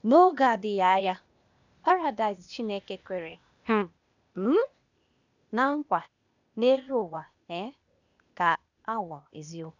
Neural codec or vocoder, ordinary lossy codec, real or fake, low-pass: codec, 16 kHz, 0.8 kbps, ZipCodec; none; fake; 7.2 kHz